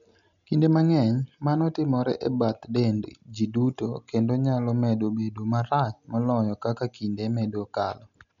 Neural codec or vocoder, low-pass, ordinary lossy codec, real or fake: none; 7.2 kHz; none; real